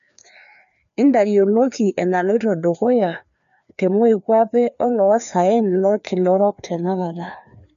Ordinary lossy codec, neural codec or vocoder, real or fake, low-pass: none; codec, 16 kHz, 2 kbps, FreqCodec, larger model; fake; 7.2 kHz